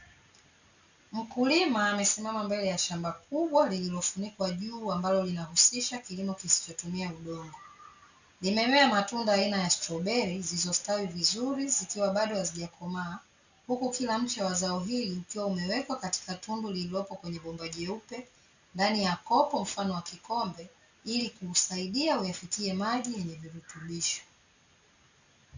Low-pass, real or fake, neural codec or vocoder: 7.2 kHz; real; none